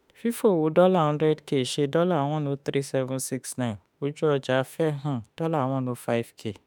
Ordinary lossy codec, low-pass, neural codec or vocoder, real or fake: none; none; autoencoder, 48 kHz, 32 numbers a frame, DAC-VAE, trained on Japanese speech; fake